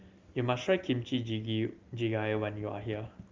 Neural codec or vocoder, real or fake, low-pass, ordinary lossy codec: none; real; 7.2 kHz; Opus, 32 kbps